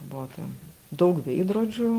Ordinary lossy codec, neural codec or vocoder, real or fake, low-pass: Opus, 24 kbps; none; real; 14.4 kHz